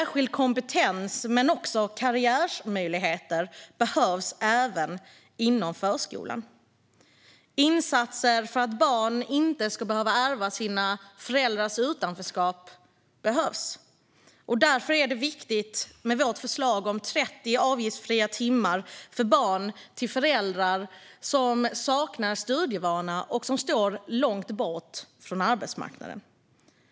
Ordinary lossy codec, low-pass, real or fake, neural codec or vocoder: none; none; real; none